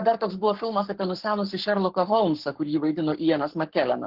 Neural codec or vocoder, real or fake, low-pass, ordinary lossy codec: codec, 44.1 kHz, 7.8 kbps, Pupu-Codec; fake; 5.4 kHz; Opus, 16 kbps